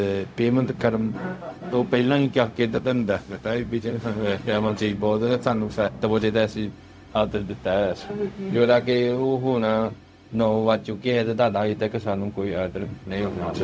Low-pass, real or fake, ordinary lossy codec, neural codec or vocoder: none; fake; none; codec, 16 kHz, 0.4 kbps, LongCat-Audio-Codec